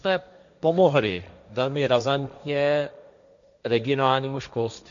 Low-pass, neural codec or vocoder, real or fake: 7.2 kHz; codec, 16 kHz, 1.1 kbps, Voila-Tokenizer; fake